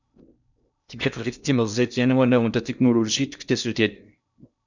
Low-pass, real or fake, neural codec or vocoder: 7.2 kHz; fake; codec, 16 kHz in and 24 kHz out, 0.6 kbps, FocalCodec, streaming, 2048 codes